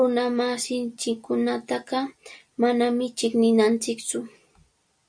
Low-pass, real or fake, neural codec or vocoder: 9.9 kHz; real; none